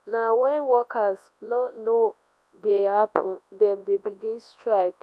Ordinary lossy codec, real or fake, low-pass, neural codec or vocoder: none; fake; none; codec, 24 kHz, 0.9 kbps, WavTokenizer, large speech release